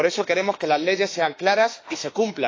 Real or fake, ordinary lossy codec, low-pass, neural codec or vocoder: fake; AAC, 32 kbps; 7.2 kHz; autoencoder, 48 kHz, 32 numbers a frame, DAC-VAE, trained on Japanese speech